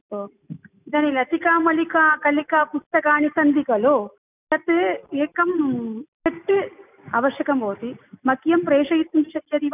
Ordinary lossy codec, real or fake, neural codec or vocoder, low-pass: none; real; none; 3.6 kHz